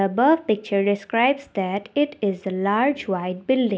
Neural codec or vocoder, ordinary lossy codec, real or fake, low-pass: none; none; real; none